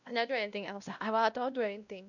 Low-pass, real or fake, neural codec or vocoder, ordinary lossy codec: 7.2 kHz; fake; codec, 16 kHz, 1 kbps, X-Codec, WavLM features, trained on Multilingual LibriSpeech; none